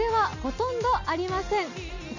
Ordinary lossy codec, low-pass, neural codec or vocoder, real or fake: none; 7.2 kHz; none; real